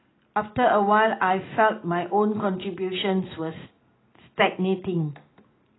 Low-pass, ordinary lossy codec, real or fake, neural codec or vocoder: 7.2 kHz; AAC, 16 kbps; real; none